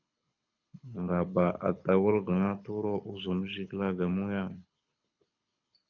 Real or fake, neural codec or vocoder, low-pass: fake; codec, 24 kHz, 6 kbps, HILCodec; 7.2 kHz